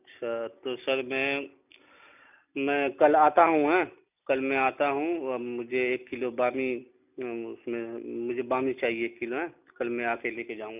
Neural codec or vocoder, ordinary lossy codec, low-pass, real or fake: none; none; 3.6 kHz; real